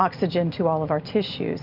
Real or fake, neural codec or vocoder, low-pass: real; none; 5.4 kHz